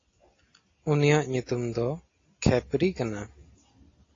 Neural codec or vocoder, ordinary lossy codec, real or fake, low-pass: none; AAC, 32 kbps; real; 7.2 kHz